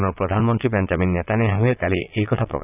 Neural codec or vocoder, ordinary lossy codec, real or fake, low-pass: vocoder, 22.05 kHz, 80 mel bands, Vocos; none; fake; 3.6 kHz